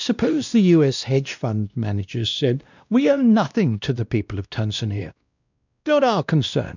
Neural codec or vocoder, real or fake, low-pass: codec, 16 kHz, 1 kbps, X-Codec, WavLM features, trained on Multilingual LibriSpeech; fake; 7.2 kHz